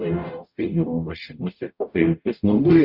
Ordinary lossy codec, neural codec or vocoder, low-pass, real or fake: Opus, 64 kbps; codec, 44.1 kHz, 0.9 kbps, DAC; 5.4 kHz; fake